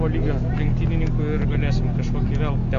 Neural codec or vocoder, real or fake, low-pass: none; real; 7.2 kHz